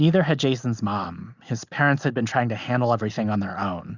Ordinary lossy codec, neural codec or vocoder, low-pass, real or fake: Opus, 64 kbps; none; 7.2 kHz; real